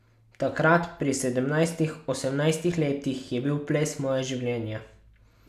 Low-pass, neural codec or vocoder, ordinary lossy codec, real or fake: 14.4 kHz; none; none; real